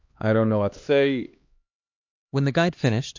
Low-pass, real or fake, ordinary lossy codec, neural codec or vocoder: 7.2 kHz; fake; MP3, 48 kbps; codec, 16 kHz, 2 kbps, X-Codec, HuBERT features, trained on LibriSpeech